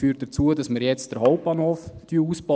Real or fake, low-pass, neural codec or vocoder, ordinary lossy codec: real; none; none; none